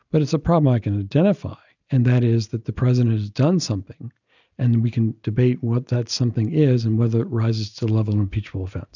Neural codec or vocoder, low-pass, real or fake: none; 7.2 kHz; real